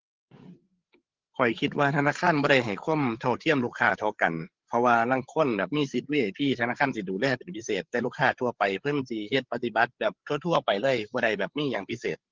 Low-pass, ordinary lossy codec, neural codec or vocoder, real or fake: 7.2 kHz; Opus, 16 kbps; codec, 16 kHz, 8 kbps, FreqCodec, larger model; fake